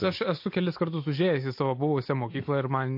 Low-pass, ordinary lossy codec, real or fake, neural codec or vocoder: 5.4 kHz; MP3, 32 kbps; real; none